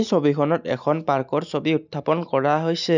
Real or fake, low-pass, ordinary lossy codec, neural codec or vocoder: real; 7.2 kHz; none; none